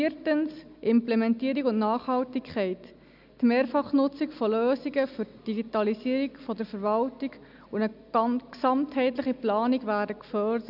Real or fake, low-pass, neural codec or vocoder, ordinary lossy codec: real; 5.4 kHz; none; none